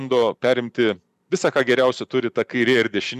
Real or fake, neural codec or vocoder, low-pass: fake; vocoder, 44.1 kHz, 128 mel bands every 512 samples, BigVGAN v2; 14.4 kHz